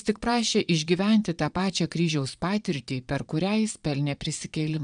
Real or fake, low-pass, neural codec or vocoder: fake; 9.9 kHz; vocoder, 22.05 kHz, 80 mel bands, Vocos